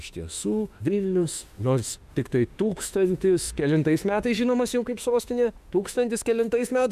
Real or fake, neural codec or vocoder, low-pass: fake; autoencoder, 48 kHz, 32 numbers a frame, DAC-VAE, trained on Japanese speech; 14.4 kHz